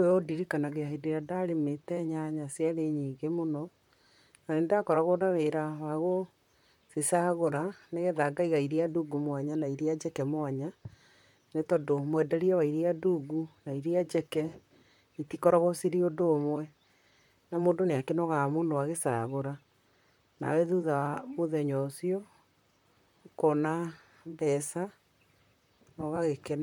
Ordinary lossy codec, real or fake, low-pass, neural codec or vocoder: MP3, 96 kbps; fake; 19.8 kHz; vocoder, 44.1 kHz, 128 mel bands, Pupu-Vocoder